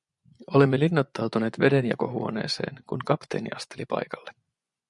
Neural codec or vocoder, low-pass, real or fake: vocoder, 24 kHz, 100 mel bands, Vocos; 10.8 kHz; fake